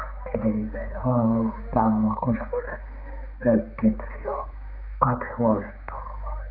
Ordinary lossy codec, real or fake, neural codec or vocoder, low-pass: none; fake; codec, 16 kHz, 4 kbps, X-Codec, HuBERT features, trained on balanced general audio; 5.4 kHz